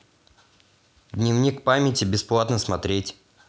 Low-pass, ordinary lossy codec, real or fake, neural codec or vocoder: none; none; real; none